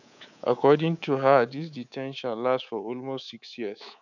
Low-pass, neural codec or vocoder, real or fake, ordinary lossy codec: 7.2 kHz; codec, 24 kHz, 3.1 kbps, DualCodec; fake; none